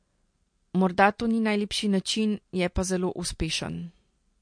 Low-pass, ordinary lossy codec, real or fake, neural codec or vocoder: 9.9 kHz; MP3, 48 kbps; real; none